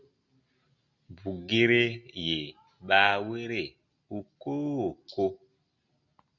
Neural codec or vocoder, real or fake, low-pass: none; real; 7.2 kHz